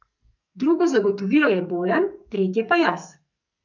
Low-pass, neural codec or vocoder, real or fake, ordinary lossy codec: 7.2 kHz; codec, 44.1 kHz, 2.6 kbps, SNAC; fake; none